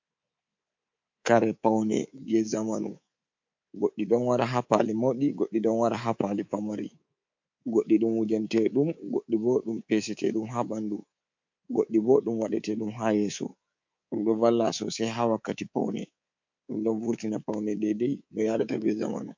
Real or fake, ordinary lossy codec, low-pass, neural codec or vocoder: fake; MP3, 48 kbps; 7.2 kHz; codec, 24 kHz, 3.1 kbps, DualCodec